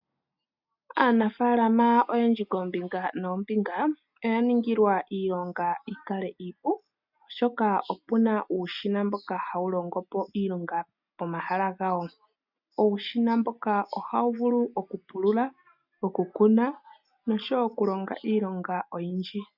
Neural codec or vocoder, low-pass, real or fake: none; 5.4 kHz; real